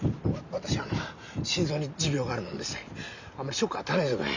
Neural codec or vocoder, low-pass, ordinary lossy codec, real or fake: none; 7.2 kHz; Opus, 64 kbps; real